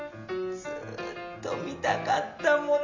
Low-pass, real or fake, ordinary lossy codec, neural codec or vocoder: 7.2 kHz; real; MP3, 64 kbps; none